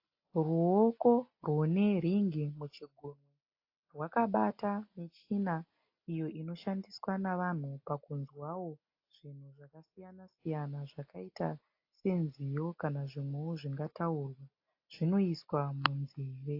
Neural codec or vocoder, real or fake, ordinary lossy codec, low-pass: none; real; AAC, 32 kbps; 5.4 kHz